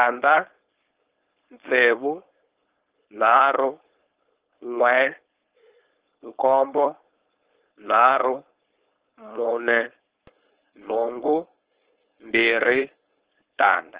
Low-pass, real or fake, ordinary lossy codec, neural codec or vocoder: 3.6 kHz; fake; Opus, 16 kbps; codec, 16 kHz, 4.8 kbps, FACodec